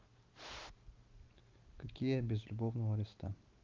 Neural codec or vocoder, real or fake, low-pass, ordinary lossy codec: none; real; 7.2 kHz; Opus, 32 kbps